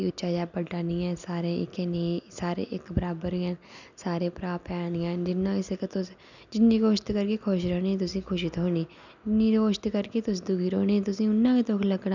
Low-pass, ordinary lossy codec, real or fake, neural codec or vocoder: 7.2 kHz; none; real; none